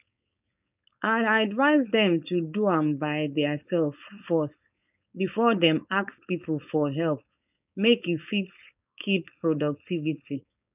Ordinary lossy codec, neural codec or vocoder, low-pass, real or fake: none; codec, 16 kHz, 4.8 kbps, FACodec; 3.6 kHz; fake